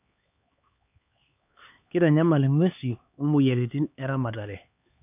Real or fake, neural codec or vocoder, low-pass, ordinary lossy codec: fake; codec, 16 kHz, 4 kbps, X-Codec, HuBERT features, trained on LibriSpeech; 3.6 kHz; none